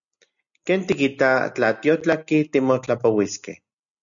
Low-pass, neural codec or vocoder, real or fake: 7.2 kHz; none; real